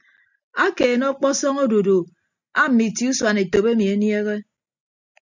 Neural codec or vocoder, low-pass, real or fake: none; 7.2 kHz; real